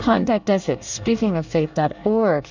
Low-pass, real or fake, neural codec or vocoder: 7.2 kHz; fake; codec, 24 kHz, 1 kbps, SNAC